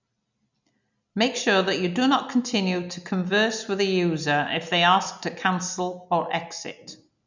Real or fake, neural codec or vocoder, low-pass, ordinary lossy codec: real; none; 7.2 kHz; none